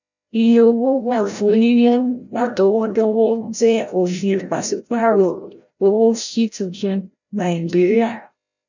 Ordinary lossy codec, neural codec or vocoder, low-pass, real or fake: none; codec, 16 kHz, 0.5 kbps, FreqCodec, larger model; 7.2 kHz; fake